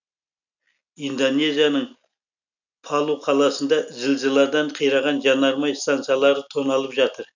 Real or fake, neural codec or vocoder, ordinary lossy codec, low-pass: real; none; none; 7.2 kHz